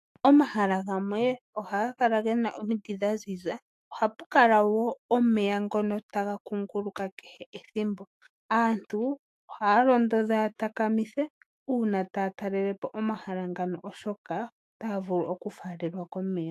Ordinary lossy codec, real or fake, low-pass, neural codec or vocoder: AAC, 64 kbps; fake; 14.4 kHz; autoencoder, 48 kHz, 128 numbers a frame, DAC-VAE, trained on Japanese speech